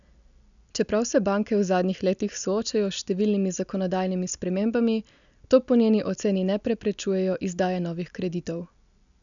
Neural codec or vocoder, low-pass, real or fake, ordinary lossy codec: none; 7.2 kHz; real; none